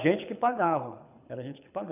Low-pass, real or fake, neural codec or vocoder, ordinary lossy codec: 3.6 kHz; fake; codec, 24 kHz, 6 kbps, HILCodec; none